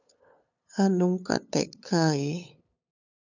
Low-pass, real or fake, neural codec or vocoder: 7.2 kHz; fake; codec, 16 kHz, 8 kbps, FunCodec, trained on LibriTTS, 25 frames a second